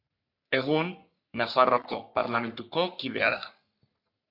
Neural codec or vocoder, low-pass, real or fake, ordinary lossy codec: codec, 44.1 kHz, 3.4 kbps, Pupu-Codec; 5.4 kHz; fake; AAC, 48 kbps